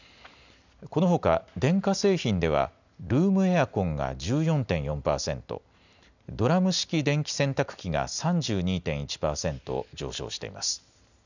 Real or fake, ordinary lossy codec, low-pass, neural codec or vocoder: real; none; 7.2 kHz; none